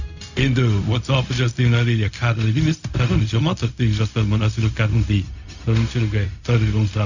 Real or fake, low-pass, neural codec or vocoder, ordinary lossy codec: fake; 7.2 kHz; codec, 16 kHz, 0.4 kbps, LongCat-Audio-Codec; none